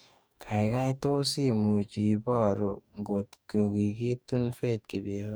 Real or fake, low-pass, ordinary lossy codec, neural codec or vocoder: fake; none; none; codec, 44.1 kHz, 2.6 kbps, DAC